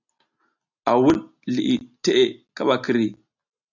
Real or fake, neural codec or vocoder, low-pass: real; none; 7.2 kHz